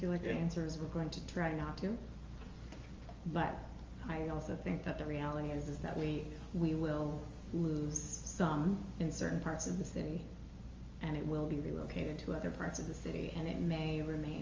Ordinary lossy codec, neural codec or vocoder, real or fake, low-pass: Opus, 32 kbps; none; real; 7.2 kHz